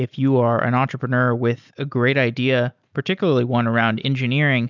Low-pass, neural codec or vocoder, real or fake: 7.2 kHz; none; real